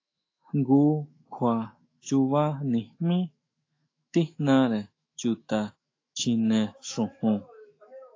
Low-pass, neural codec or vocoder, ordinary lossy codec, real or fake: 7.2 kHz; autoencoder, 48 kHz, 128 numbers a frame, DAC-VAE, trained on Japanese speech; AAC, 32 kbps; fake